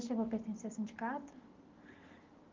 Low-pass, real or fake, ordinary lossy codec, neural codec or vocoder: 7.2 kHz; real; Opus, 32 kbps; none